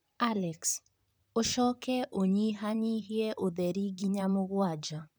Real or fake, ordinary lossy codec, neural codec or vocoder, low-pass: fake; none; vocoder, 44.1 kHz, 128 mel bands, Pupu-Vocoder; none